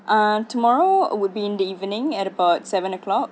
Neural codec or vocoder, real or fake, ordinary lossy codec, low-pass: none; real; none; none